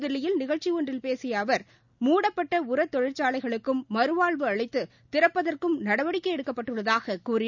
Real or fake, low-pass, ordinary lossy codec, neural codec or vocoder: real; 7.2 kHz; none; none